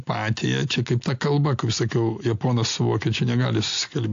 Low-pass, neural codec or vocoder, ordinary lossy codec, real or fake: 7.2 kHz; none; AAC, 48 kbps; real